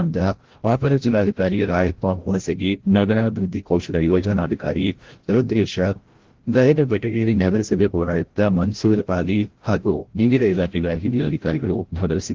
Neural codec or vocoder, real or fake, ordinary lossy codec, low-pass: codec, 16 kHz, 0.5 kbps, FreqCodec, larger model; fake; Opus, 16 kbps; 7.2 kHz